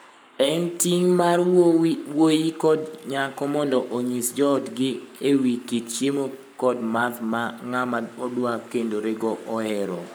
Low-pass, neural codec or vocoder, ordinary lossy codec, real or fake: none; codec, 44.1 kHz, 7.8 kbps, Pupu-Codec; none; fake